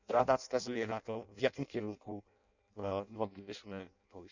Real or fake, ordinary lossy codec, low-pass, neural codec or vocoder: fake; none; 7.2 kHz; codec, 16 kHz in and 24 kHz out, 0.6 kbps, FireRedTTS-2 codec